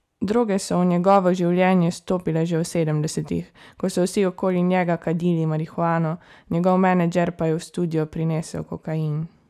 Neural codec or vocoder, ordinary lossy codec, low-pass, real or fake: none; none; 14.4 kHz; real